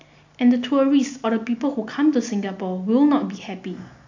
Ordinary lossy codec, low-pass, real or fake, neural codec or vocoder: MP3, 64 kbps; 7.2 kHz; real; none